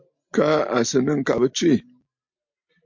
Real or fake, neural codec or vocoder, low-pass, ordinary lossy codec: real; none; 7.2 kHz; MP3, 48 kbps